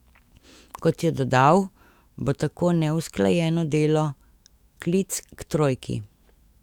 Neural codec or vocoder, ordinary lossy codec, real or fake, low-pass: autoencoder, 48 kHz, 128 numbers a frame, DAC-VAE, trained on Japanese speech; Opus, 64 kbps; fake; 19.8 kHz